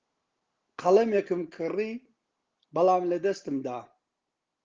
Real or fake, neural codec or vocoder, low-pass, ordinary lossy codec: real; none; 7.2 kHz; Opus, 16 kbps